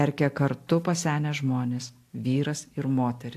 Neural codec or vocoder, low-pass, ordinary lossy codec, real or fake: none; 14.4 kHz; AAC, 64 kbps; real